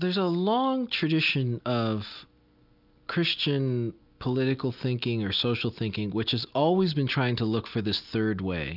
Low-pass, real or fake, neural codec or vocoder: 5.4 kHz; real; none